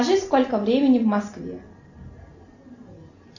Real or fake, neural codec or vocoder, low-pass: real; none; 7.2 kHz